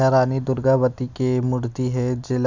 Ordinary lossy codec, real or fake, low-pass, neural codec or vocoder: none; real; 7.2 kHz; none